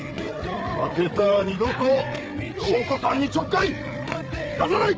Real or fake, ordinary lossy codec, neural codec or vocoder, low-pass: fake; none; codec, 16 kHz, 8 kbps, FreqCodec, larger model; none